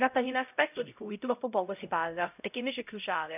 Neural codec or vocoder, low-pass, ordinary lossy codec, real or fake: codec, 16 kHz, 0.5 kbps, X-Codec, HuBERT features, trained on LibriSpeech; 3.6 kHz; none; fake